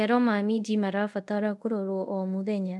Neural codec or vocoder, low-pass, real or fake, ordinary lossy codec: codec, 24 kHz, 0.5 kbps, DualCodec; none; fake; none